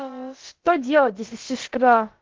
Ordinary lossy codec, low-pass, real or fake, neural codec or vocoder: Opus, 16 kbps; 7.2 kHz; fake; codec, 16 kHz, about 1 kbps, DyCAST, with the encoder's durations